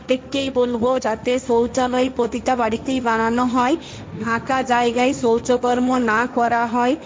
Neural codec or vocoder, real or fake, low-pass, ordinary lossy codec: codec, 16 kHz, 1.1 kbps, Voila-Tokenizer; fake; none; none